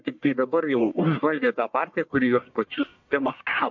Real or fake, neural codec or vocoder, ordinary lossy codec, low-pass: fake; codec, 44.1 kHz, 1.7 kbps, Pupu-Codec; MP3, 64 kbps; 7.2 kHz